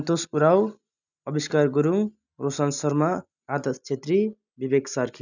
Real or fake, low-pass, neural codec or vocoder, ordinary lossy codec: real; 7.2 kHz; none; none